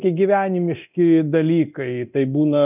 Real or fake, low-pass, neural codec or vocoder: fake; 3.6 kHz; codec, 24 kHz, 0.9 kbps, DualCodec